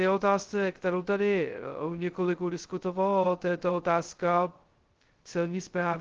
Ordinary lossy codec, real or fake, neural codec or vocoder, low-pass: Opus, 16 kbps; fake; codec, 16 kHz, 0.2 kbps, FocalCodec; 7.2 kHz